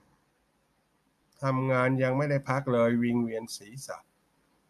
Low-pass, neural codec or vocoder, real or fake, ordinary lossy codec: 14.4 kHz; none; real; none